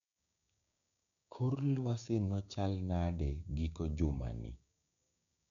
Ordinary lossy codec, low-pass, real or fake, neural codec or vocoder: none; 7.2 kHz; fake; codec, 16 kHz, 6 kbps, DAC